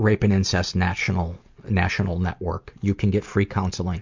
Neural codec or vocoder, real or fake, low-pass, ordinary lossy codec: none; real; 7.2 kHz; MP3, 64 kbps